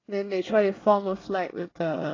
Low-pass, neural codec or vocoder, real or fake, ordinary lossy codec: 7.2 kHz; codec, 24 kHz, 1 kbps, SNAC; fake; AAC, 32 kbps